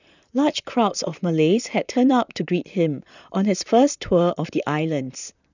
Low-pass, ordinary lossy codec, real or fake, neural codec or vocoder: 7.2 kHz; none; fake; vocoder, 44.1 kHz, 128 mel bands, Pupu-Vocoder